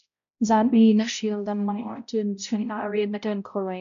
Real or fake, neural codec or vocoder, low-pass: fake; codec, 16 kHz, 0.5 kbps, X-Codec, HuBERT features, trained on balanced general audio; 7.2 kHz